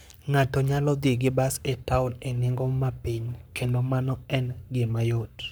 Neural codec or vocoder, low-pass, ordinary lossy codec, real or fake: codec, 44.1 kHz, 7.8 kbps, Pupu-Codec; none; none; fake